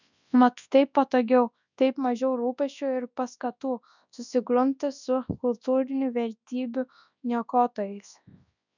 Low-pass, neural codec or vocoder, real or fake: 7.2 kHz; codec, 24 kHz, 0.9 kbps, WavTokenizer, large speech release; fake